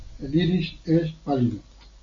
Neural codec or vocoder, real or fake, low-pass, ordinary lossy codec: none; real; 7.2 kHz; MP3, 32 kbps